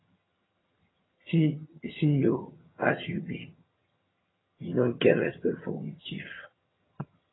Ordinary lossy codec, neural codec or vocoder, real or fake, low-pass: AAC, 16 kbps; vocoder, 22.05 kHz, 80 mel bands, HiFi-GAN; fake; 7.2 kHz